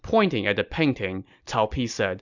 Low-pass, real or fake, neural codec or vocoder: 7.2 kHz; real; none